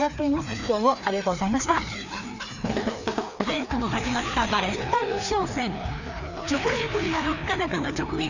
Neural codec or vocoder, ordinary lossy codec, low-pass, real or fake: codec, 16 kHz, 2 kbps, FreqCodec, larger model; none; 7.2 kHz; fake